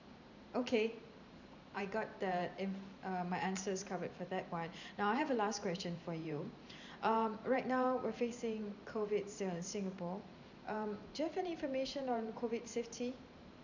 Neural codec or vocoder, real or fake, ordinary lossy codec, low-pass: vocoder, 44.1 kHz, 128 mel bands every 512 samples, BigVGAN v2; fake; none; 7.2 kHz